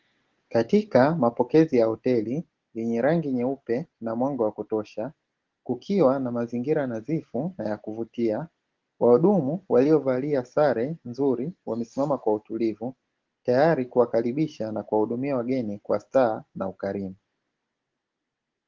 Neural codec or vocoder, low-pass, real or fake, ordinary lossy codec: none; 7.2 kHz; real; Opus, 16 kbps